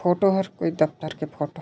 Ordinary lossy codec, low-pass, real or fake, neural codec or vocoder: none; none; real; none